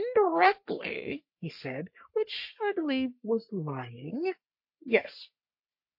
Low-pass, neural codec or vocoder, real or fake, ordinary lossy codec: 5.4 kHz; codec, 44.1 kHz, 3.4 kbps, Pupu-Codec; fake; MP3, 32 kbps